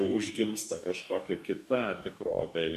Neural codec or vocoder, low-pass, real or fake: codec, 44.1 kHz, 2.6 kbps, DAC; 14.4 kHz; fake